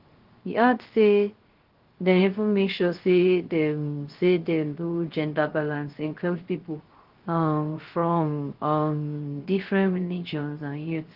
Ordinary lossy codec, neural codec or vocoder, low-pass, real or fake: Opus, 16 kbps; codec, 16 kHz, 0.3 kbps, FocalCodec; 5.4 kHz; fake